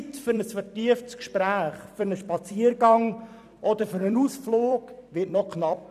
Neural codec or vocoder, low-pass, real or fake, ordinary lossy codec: vocoder, 44.1 kHz, 128 mel bands every 256 samples, BigVGAN v2; 14.4 kHz; fake; none